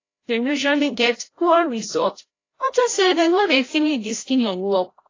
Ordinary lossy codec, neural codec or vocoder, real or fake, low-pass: AAC, 32 kbps; codec, 16 kHz, 0.5 kbps, FreqCodec, larger model; fake; 7.2 kHz